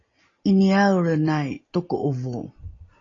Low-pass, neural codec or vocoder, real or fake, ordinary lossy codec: 7.2 kHz; none; real; AAC, 32 kbps